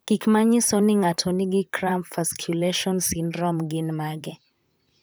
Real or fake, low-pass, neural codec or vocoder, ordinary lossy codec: fake; none; vocoder, 44.1 kHz, 128 mel bands, Pupu-Vocoder; none